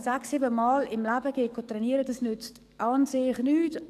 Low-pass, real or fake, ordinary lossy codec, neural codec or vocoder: 14.4 kHz; fake; none; codec, 44.1 kHz, 7.8 kbps, DAC